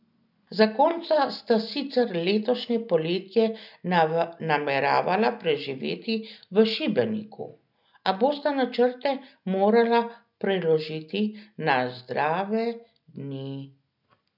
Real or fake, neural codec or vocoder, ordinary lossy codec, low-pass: real; none; none; 5.4 kHz